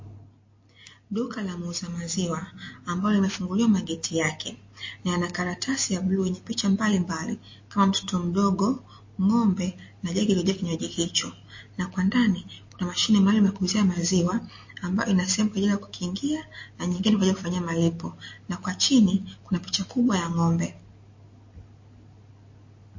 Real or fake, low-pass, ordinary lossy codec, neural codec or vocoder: real; 7.2 kHz; MP3, 32 kbps; none